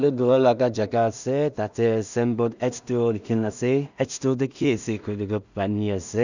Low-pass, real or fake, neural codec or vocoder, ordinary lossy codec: 7.2 kHz; fake; codec, 16 kHz in and 24 kHz out, 0.4 kbps, LongCat-Audio-Codec, two codebook decoder; none